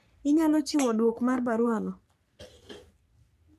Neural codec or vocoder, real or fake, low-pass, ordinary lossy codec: codec, 44.1 kHz, 3.4 kbps, Pupu-Codec; fake; 14.4 kHz; none